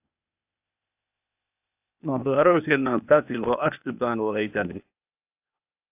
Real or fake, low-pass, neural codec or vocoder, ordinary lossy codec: fake; 3.6 kHz; codec, 16 kHz, 0.8 kbps, ZipCodec; AAC, 32 kbps